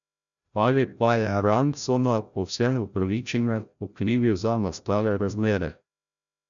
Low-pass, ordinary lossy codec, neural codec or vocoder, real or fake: 7.2 kHz; none; codec, 16 kHz, 0.5 kbps, FreqCodec, larger model; fake